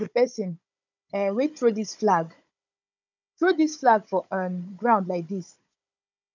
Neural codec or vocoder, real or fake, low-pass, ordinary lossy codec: codec, 16 kHz, 16 kbps, FunCodec, trained on Chinese and English, 50 frames a second; fake; 7.2 kHz; none